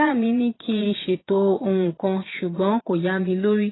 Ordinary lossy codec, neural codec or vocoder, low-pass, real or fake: AAC, 16 kbps; vocoder, 22.05 kHz, 80 mel bands, Vocos; 7.2 kHz; fake